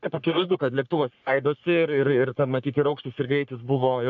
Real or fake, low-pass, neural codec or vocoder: fake; 7.2 kHz; codec, 44.1 kHz, 3.4 kbps, Pupu-Codec